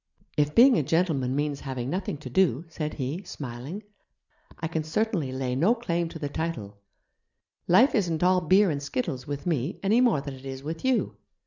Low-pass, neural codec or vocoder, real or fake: 7.2 kHz; none; real